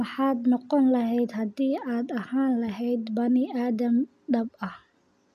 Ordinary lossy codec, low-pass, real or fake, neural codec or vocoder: none; 14.4 kHz; real; none